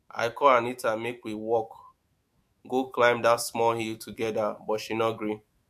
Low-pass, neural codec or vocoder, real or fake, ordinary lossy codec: 14.4 kHz; autoencoder, 48 kHz, 128 numbers a frame, DAC-VAE, trained on Japanese speech; fake; MP3, 64 kbps